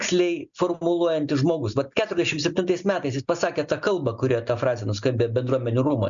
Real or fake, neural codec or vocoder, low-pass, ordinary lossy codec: real; none; 7.2 kHz; MP3, 96 kbps